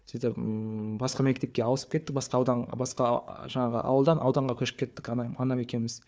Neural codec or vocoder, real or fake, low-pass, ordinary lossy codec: codec, 16 kHz, 4 kbps, FunCodec, trained on Chinese and English, 50 frames a second; fake; none; none